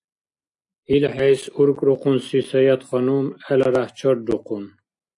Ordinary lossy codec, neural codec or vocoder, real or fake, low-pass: AAC, 64 kbps; none; real; 10.8 kHz